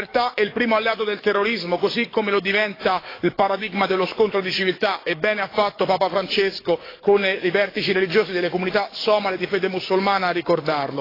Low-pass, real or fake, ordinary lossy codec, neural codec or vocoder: 5.4 kHz; fake; AAC, 24 kbps; codec, 44.1 kHz, 7.8 kbps, DAC